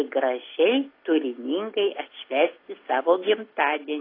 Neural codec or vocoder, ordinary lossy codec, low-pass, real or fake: none; AAC, 24 kbps; 5.4 kHz; real